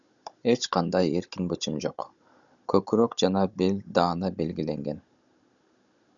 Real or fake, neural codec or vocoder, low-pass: fake; codec, 16 kHz, 16 kbps, FunCodec, trained on Chinese and English, 50 frames a second; 7.2 kHz